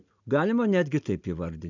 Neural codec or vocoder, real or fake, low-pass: none; real; 7.2 kHz